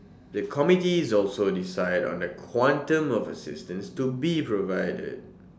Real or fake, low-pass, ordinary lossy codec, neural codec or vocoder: real; none; none; none